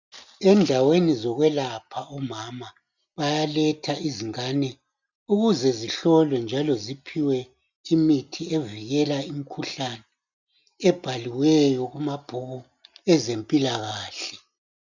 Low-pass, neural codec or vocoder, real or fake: 7.2 kHz; none; real